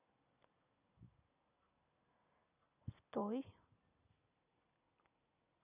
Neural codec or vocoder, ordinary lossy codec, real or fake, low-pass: none; none; real; 3.6 kHz